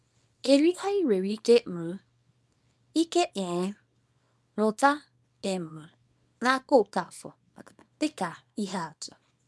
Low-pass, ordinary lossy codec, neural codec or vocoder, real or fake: none; none; codec, 24 kHz, 0.9 kbps, WavTokenizer, small release; fake